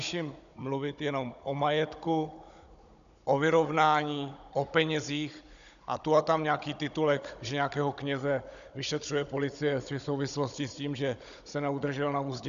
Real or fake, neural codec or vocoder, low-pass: fake; codec, 16 kHz, 16 kbps, FunCodec, trained on Chinese and English, 50 frames a second; 7.2 kHz